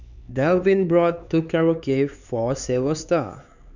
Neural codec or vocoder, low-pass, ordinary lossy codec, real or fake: codec, 16 kHz, 4 kbps, X-Codec, HuBERT features, trained on LibriSpeech; 7.2 kHz; none; fake